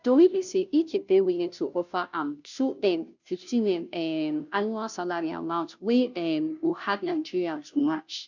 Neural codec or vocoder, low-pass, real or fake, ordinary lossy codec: codec, 16 kHz, 0.5 kbps, FunCodec, trained on Chinese and English, 25 frames a second; 7.2 kHz; fake; none